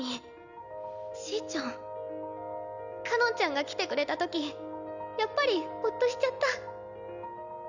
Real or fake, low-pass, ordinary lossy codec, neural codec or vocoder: real; 7.2 kHz; none; none